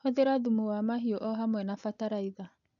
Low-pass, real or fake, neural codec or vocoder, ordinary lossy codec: 7.2 kHz; real; none; none